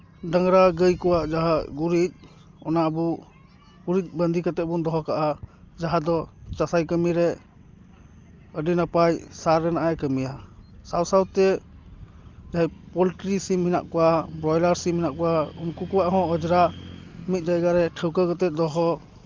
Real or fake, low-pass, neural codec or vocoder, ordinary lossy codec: real; 7.2 kHz; none; Opus, 32 kbps